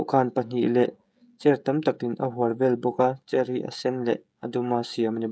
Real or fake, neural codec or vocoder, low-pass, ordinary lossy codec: fake; codec, 16 kHz, 16 kbps, FreqCodec, smaller model; none; none